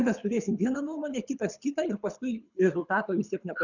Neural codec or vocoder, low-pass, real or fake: codec, 24 kHz, 6 kbps, HILCodec; 7.2 kHz; fake